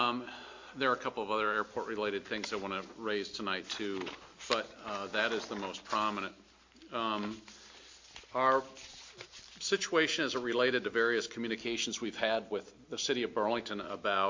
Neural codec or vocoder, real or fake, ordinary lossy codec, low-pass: none; real; MP3, 48 kbps; 7.2 kHz